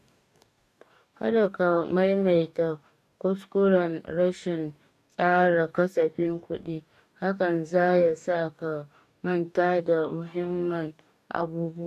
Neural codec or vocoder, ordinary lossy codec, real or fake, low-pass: codec, 44.1 kHz, 2.6 kbps, DAC; none; fake; 14.4 kHz